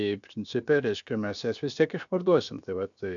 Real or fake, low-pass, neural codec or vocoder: fake; 7.2 kHz; codec, 16 kHz, 0.7 kbps, FocalCodec